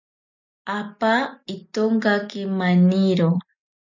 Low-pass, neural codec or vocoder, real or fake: 7.2 kHz; none; real